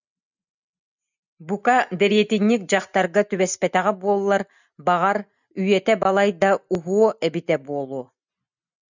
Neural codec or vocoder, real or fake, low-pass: none; real; 7.2 kHz